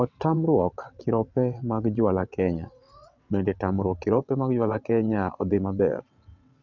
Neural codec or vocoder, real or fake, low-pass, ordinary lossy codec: vocoder, 22.05 kHz, 80 mel bands, WaveNeXt; fake; 7.2 kHz; none